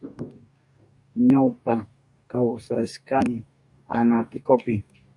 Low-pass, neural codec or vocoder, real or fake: 10.8 kHz; codec, 44.1 kHz, 2.6 kbps, DAC; fake